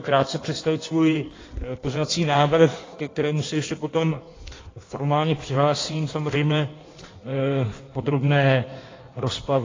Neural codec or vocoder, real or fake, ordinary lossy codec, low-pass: codec, 16 kHz in and 24 kHz out, 1.1 kbps, FireRedTTS-2 codec; fake; AAC, 32 kbps; 7.2 kHz